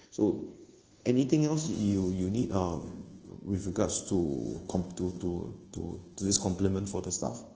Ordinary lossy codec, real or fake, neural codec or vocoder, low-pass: Opus, 16 kbps; fake; codec, 24 kHz, 1.2 kbps, DualCodec; 7.2 kHz